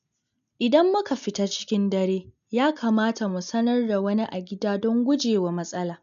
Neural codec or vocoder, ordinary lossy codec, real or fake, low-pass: none; none; real; 7.2 kHz